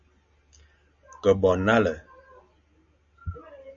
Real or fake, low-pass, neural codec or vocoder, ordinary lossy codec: real; 7.2 kHz; none; AAC, 64 kbps